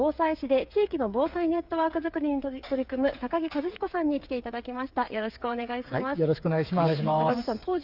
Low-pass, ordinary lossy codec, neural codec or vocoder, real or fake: 5.4 kHz; Opus, 64 kbps; codec, 16 kHz, 8 kbps, FreqCodec, smaller model; fake